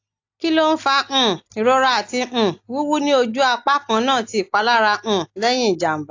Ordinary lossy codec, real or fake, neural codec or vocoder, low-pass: AAC, 48 kbps; real; none; 7.2 kHz